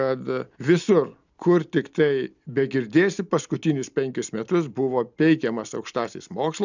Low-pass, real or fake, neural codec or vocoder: 7.2 kHz; real; none